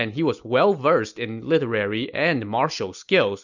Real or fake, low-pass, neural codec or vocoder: real; 7.2 kHz; none